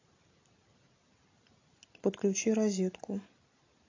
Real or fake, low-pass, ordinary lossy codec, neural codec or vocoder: real; 7.2 kHz; AAC, 32 kbps; none